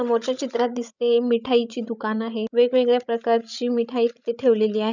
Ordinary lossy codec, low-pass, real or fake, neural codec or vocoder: none; 7.2 kHz; fake; codec, 16 kHz, 16 kbps, FreqCodec, larger model